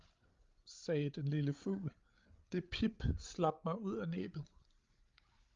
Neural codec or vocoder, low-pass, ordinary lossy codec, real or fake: codec, 16 kHz, 8 kbps, FreqCodec, larger model; 7.2 kHz; Opus, 32 kbps; fake